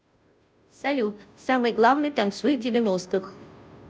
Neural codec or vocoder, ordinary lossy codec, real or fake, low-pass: codec, 16 kHz, 0.5 kbps, FunCodec, trained on Chinese and English, 25 frames a second; none; fake; none